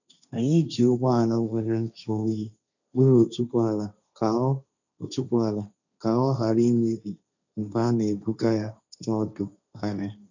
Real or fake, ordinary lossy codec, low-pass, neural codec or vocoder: fake; none; 7.2 kHz; codec, 16 kHz, 1.1 kbps, Voila-Tokenizer